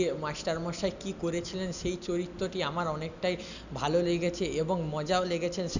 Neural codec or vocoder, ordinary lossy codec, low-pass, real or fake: none; none; 7.2 kHz; real